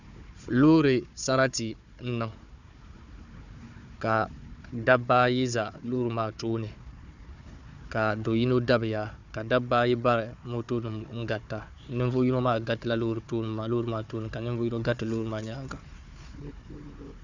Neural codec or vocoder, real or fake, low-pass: codec, 16 kHz, 4 kbps, FunCodec, trained on Chinese and English, 50 frames a second; fake; 7.2 kHz